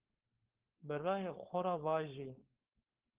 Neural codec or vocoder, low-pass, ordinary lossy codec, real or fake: codec, 16 kHz, 4.8 kbps, FACodec; 3.6 kHz; Opus, 16 kbps; fake